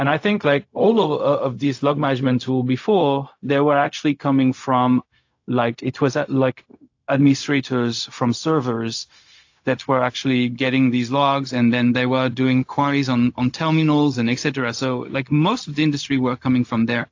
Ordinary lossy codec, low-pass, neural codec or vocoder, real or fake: AAC, 48 kbps; 7.2 kHz; codec, 16 kHz, 0.4 kbps, LongCat-Audio-Codec; fake